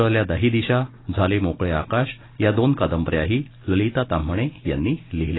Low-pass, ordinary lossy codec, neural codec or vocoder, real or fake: 7.2 kHz; AAC, 16 kbps; none; real